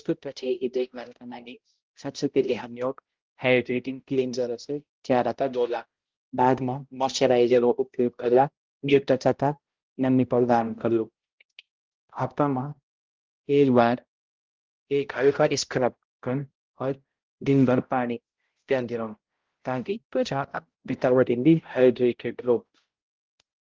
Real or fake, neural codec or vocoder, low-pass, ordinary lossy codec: fake; codec, 16 kHz, 0.5 kbps, X-Codec, HuBERT features, trained on balanced general audio; 7.2 kHz; Opus, 16 kbps